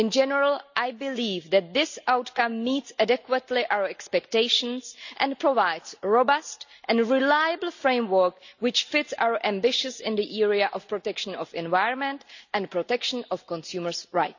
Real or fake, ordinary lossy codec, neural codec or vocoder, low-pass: real; none; none; 7.2 kHz